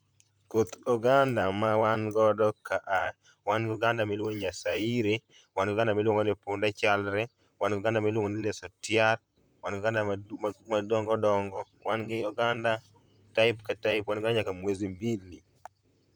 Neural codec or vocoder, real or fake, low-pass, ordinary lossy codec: vocoder, 44.1 kHz, 128 mel bands, Pupu-Vocoder; fake; none; none